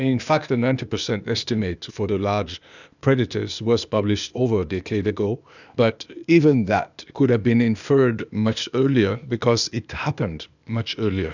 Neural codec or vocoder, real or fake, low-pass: codec, 16 kHz, 0.8 kbps, ZipCodec; fake; 7.2 kHz